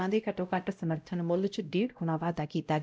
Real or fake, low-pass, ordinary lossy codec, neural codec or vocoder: fake; none; none; codec, 16 kHz, 0.5 kbps, X-Codec, WavLM features, trained on Multilingual LibriSpeech